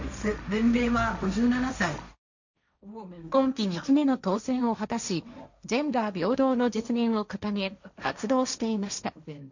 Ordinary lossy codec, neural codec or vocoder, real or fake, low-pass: none; codec, 16 kHz, 1.1 kbps, Voila-Tokenizer; fake; 7.2 kHz